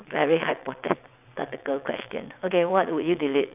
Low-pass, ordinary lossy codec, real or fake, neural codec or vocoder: 3.6 kHz; none; fake; vocoder, 22.05 kHz, 80 mel bands, WaveNeXt